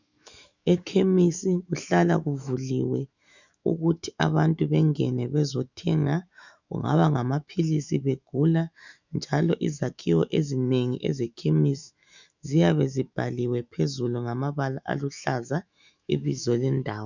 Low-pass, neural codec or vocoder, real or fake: 7.2 kHz; autoencoder, 48 kHz, 128 numbers a frame, DAC-VAE, trained on Japanese speech; fake